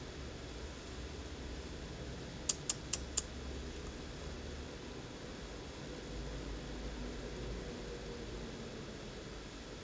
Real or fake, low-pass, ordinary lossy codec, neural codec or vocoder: real; none; none; none